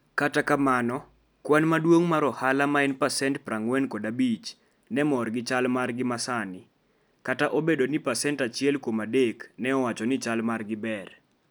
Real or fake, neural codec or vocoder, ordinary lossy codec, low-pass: real; none; none; none